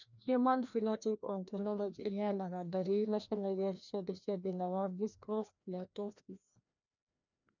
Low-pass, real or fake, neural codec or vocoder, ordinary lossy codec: 7.2 kHz; fake; codec, 16 kHz, 1 kbps, FreqCodec, larger model; none